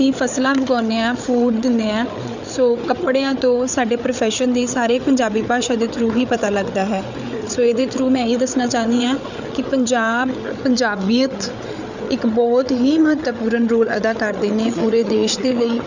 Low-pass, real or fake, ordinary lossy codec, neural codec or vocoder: 7.2 kHz; fake; none; codec, 16 kHz, 8 kbps, FreqCodec, larger model